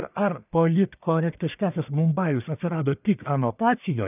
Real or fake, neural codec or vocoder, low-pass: fake; codec, 44.1 kHz, 1.7 kbps, Pupu-Codec; 3.6 kHz